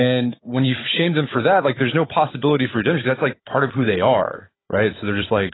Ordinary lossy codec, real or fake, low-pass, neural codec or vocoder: AAC, 16 kbps; fake; 7.2 kHz; codec, 16 kHz, 16 kbps, FunCodec, trained on Chinese and English, 50 frames a second